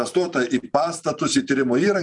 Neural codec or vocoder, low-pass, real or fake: vocoder, 44.1 kHz, 128 mel bands every 256 samples, BigVGAN v2; 10.8 kHz; fake